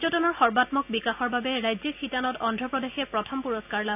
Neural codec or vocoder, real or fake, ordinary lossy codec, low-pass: none; real; none; 3.6 kHz